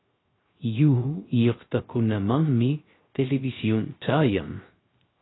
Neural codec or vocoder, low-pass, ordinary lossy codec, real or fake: codec, 16 kHz, 0.3 kbps, FocalCodec; 7.2 kHz; AAC, 16 kbps; fake